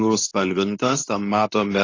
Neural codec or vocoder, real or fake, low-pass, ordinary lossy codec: codec, 24 kHz, 0.9 kbps, WavTokenizer, medium speech release version 2; fake; 7.2 kHz; AAC, 32 kbps